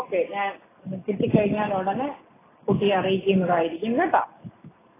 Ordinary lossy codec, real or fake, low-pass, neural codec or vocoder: AAC, 16 kbps; real; 3.6 kHz; none